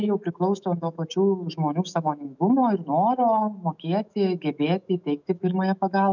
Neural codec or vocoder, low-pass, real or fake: autoencoder, 48 kHz, 128 numbers a frame, DAC-VAE, trained on Japanese speech; 7.2 kHz; fake